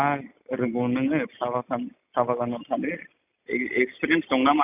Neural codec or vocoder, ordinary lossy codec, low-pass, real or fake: none; none; 3.6 kHz; real